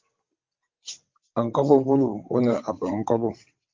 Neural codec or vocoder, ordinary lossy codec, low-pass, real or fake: vocoder, 22.05 kHz, 80 mel bands, WaveNeXt; Opus, 24 kbps; 7.2 kHz; fake